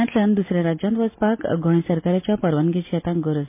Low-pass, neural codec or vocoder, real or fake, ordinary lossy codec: 3.6 kHz; none; real; MP3, 24 kbps